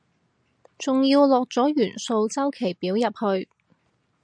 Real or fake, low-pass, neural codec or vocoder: real; 9.9 kHz; none